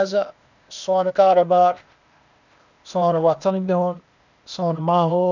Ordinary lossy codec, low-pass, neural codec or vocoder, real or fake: none; 7.2 kHz; codec, 16 kHz, 0.8 kbps, ZipCodec; fake